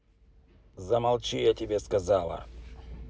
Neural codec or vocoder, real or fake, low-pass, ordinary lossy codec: none; real; none; none